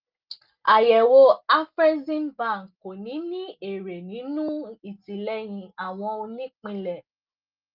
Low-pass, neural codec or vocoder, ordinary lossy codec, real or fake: 5.4 kHz; none; Opus, 32 kbps; real